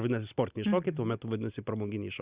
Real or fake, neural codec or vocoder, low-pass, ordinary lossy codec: real; none; 3.6 kHz; Opus, 64 kbps